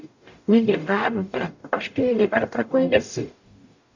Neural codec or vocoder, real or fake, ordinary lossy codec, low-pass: codec, 44.1 kHz, 0.9 kbps, DAC; fake; none; 7.2 kHz